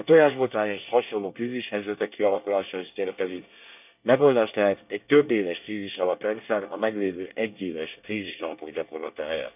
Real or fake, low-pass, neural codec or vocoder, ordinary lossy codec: fake; 3.6 kHz; codec, 24 kHz, 1 kbps, SNAC; none